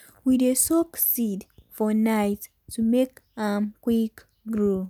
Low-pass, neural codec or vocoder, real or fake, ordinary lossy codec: none; none; real; none